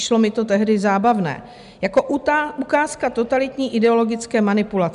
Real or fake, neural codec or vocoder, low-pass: real; none; 10.8 kHz